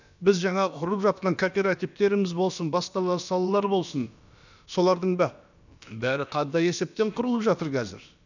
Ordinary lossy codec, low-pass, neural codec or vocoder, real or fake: none; 7.2 kHz; codec, 16 kHz, about 1 kbps, DyCAST, with the encoder's durations; fake